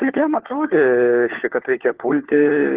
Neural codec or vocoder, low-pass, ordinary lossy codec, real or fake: codec, 16 kHz, 4 kbps, FunCodec, trained on LibriTTS, 50 frames a second; 3.6 kHz; Opus, 16 kbps; fake